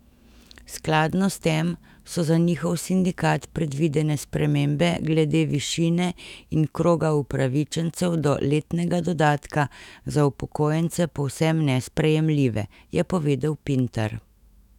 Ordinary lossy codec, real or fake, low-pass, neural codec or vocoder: none; fake; 19.8 kHz; autoencoder, 48 kHz, 128 numbers a frame, DAC-VAE, trained on Japanese speech